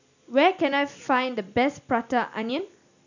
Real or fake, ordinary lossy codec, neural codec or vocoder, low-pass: real; none; none; 7.2 kHz